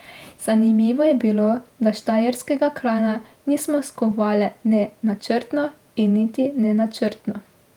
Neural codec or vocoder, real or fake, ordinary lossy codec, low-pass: vocoder, 48 kHz, 128 mel bands, Vocos; fake; Opus, 32 kbps; 19.8 kHz